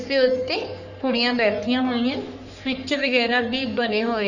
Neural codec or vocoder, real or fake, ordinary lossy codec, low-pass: codec, 44.1 kHz, 3.4 kbps, Pupu-Codec; fake; none; 7.2 kHz